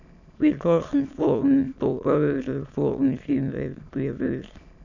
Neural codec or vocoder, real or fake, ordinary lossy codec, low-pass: autoencoder, 22.05 kHz, a latent of 192 numbers a frame, VITS, trained on many speakers; fake; none; 7.2 kHz